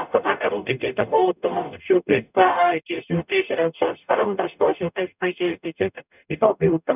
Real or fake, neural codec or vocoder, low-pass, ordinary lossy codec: fake; codec, 44.1 kHz, 0.9 kbps, DAC; 3.6 kHz; none